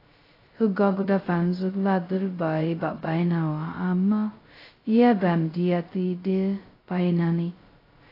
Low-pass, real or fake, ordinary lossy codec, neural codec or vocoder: 5.4 kHz; fake; AAC, 24 kbps; codec, 16 kHz, 0.2 kbps, FocalCodec